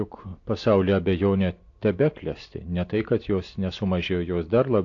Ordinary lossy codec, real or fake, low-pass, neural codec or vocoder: AAC, 48 kbps; real; 7.2 kHz; none